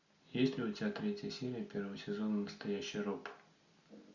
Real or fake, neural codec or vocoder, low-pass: real; none; 7.2 kHz